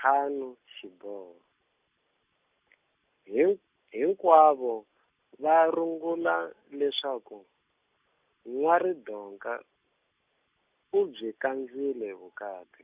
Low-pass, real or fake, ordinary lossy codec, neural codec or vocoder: 3.6 kHz; real; Opus, 64 kbps; none